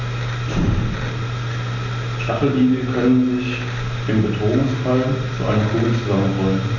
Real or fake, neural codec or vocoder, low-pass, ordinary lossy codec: real; none; 7.2 kHz; none